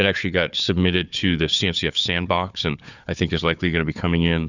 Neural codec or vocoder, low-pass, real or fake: codec, 16 kHz, 4 kbps, FunCodec, trained on Chinese and English, 50 frames a second; 7.2 kHz; fake